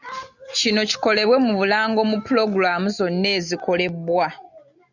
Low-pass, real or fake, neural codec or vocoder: 7.2 kHz; real; none